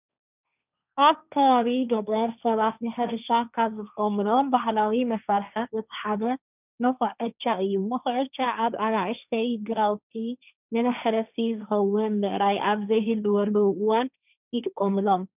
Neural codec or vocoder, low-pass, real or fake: codec, 16 kHz, 1.1 kbps, Voila-Tokenizer; 3.6 kHz; fake